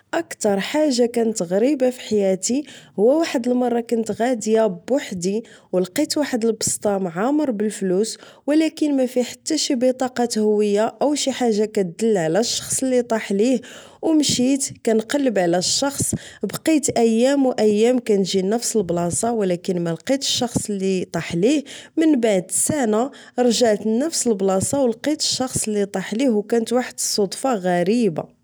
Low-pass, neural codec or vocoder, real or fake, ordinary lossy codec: none; none; real; none